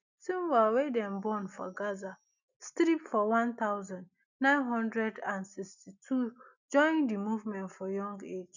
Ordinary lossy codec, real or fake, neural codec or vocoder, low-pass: none; real; none; 7.2 kHz